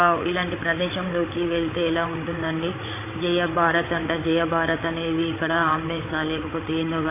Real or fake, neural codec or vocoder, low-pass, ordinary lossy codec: fake; codec, 16 kHz, 8 kbps, FreqCodec, larger model; 3.6 kHz; AAC, 16 kbps